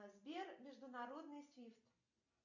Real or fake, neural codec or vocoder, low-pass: real; none; 7.2 kHz